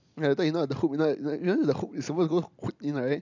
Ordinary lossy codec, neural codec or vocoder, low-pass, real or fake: none; none; 7.2 kHz; real